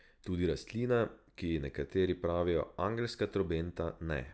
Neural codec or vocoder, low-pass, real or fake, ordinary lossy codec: none; none; real; none